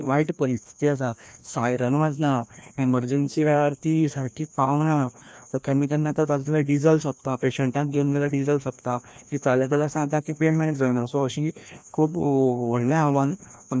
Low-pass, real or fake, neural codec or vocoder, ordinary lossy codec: none; fake; codec, 16 kHz, 1 kbps, FreqCodec, larger model; none